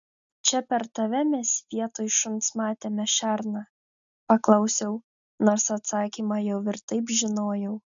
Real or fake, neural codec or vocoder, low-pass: real; none; 7.2 kHz